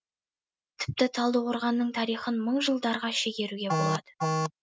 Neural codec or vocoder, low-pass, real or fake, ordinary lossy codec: none; none; real; none